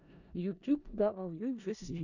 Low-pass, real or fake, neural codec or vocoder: 7.2 kHz; fake; codec, 16 kHz in and 24 kHz out, 0.4 kbps, LongCat-Audio-Codec, four codebook decoder